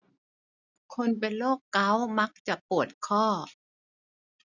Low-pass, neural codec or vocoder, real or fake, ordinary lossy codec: 7.2 kHz; none; real; none